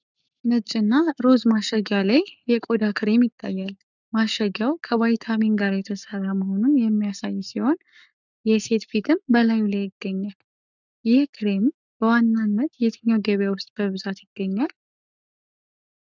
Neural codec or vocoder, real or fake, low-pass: codec, 44.1 kHz, 7.8 kbps, Pupu-Codec; fake; 7.2 kHz